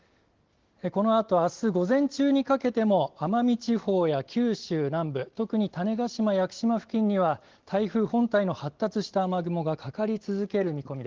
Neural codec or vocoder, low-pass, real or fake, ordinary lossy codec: none; 7.2 kHz; real; Opus, 16 kbps